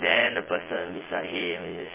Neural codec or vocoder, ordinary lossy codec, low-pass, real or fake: vocoder, 22.05 kHz, 80 mel bands, Vocos; MP3, 16 kbps; 3.6 kHz; fake